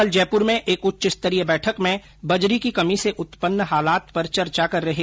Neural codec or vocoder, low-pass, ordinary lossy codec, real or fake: none; none; none; real